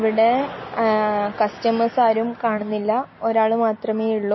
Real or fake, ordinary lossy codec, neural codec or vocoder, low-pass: real; MP3, 24 kbps; none; 7.2 kHz